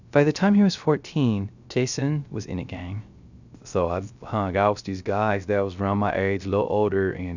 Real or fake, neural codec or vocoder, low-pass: fake; codec, 16 kHz, 0.3 kbps, FocalCodec; 7.2 kHz